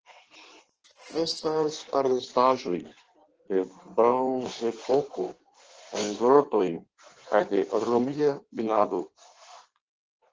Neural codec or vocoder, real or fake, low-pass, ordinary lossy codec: codec, 16 kHz in and 24 kHz out, 1.1 kbps, FireRedTTS-2 codec; fake; 7.2 kHz; Opus, 16 kbps